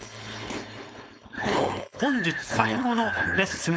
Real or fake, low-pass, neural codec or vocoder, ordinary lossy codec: fake; none; codec, 16 kHz, 4.8 kbps, FACodec; none